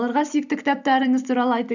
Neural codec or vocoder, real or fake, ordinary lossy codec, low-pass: vocoder, 44.1 kHz, 128 mel bands every 256 samples, BigVGAN v2; fake; none; 7.2 kHz